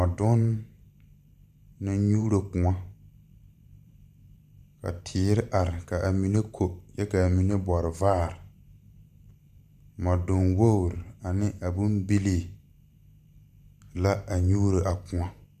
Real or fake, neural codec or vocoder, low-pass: fake; vocoder, 44.1 kHz, 128 mel bands every 512 samples, BigVGAN v2; 14.4 kHz